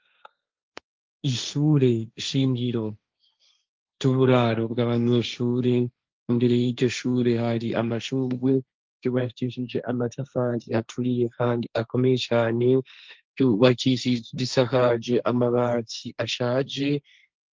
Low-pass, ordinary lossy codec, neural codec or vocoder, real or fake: 7.2 kHz; Opus, 24 kbps; codec, 16 kHz, 1.1 kbps, Voila-Tokenizer; fake